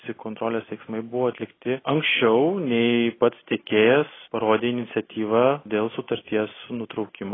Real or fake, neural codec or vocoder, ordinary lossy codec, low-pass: real; none; AAC, 16 kbps; 7.2 kHz